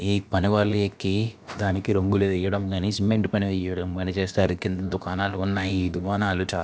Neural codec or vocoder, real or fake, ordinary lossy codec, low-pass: codec, 16 kHz, about 1 kbps, DyCAST, with the encoder's durations; fake; none; none